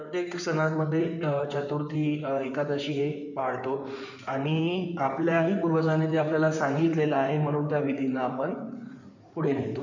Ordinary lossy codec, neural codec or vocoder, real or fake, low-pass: none; codec, 16 kHz in and 24 kHz out, 2.2 kbps, FireRedTTS-2 codec; fake; 7.2 kHz